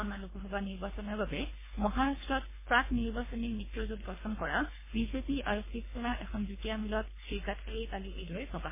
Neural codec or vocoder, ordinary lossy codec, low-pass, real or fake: codec, 24 kHz, 3 kbps, HILCodec; MP3, 16 kbps; 3.6 kHz; fake